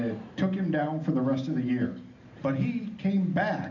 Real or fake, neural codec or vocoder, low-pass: real; none; 7.2 kHz